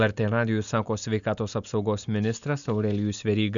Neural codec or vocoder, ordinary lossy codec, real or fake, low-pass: none; MP3, 96 kbps; real; 7.2 kHz